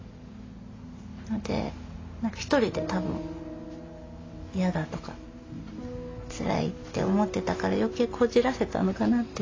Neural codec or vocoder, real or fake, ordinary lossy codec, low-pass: none; real; AAC, 32 kbps; 7.2 kHz